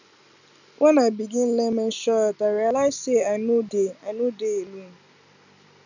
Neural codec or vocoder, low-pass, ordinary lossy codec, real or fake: none; 7.2 kHz; none; real